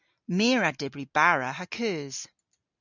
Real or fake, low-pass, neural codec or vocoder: real; 7.2 kHz; none